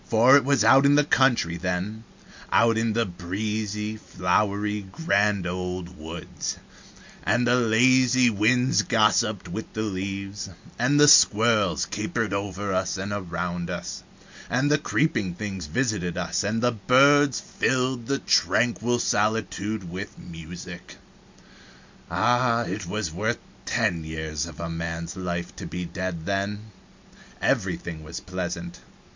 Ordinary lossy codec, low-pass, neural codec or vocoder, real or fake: MP3, 64 kbps; 7.2 kHz; none; real